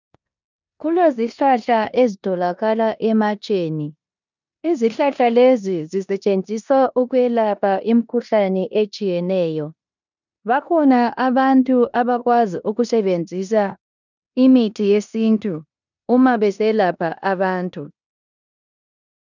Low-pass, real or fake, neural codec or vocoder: 7.2 kHz; fake; codec, 16 kHz in and 24 kHz out, 0.9 kbps, LongCat-Audio-Codec, four codebook decoder